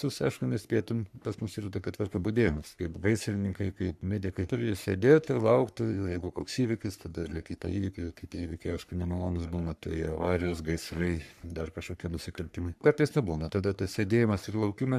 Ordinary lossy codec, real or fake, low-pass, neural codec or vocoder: AAC, 96 kbps; fake; 14.4 kHz; codec, 44.1 kHz, 3.4 kbps, Pupu-Codec